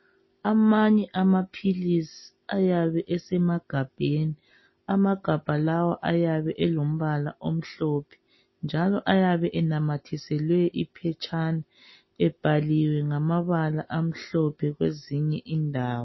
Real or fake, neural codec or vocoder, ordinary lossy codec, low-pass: real; none; MP3, 24 kbps; 7.2 kHz